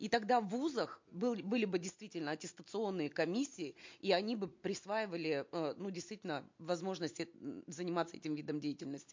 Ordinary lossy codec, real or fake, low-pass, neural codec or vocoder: MP3, 48 kbps; real; 7.2 kHz; none